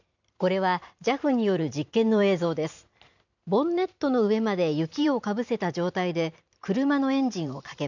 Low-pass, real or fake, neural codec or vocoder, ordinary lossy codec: 7.2 kHz; fake; vocoder, 44.1 kHz, 128 mel bands every 512 samples, BigVGAN v2; none